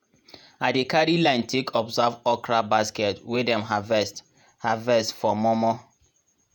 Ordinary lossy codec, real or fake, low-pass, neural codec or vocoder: none; fake; none; vocoder, 48 kHz, 128 mel bands, Vocos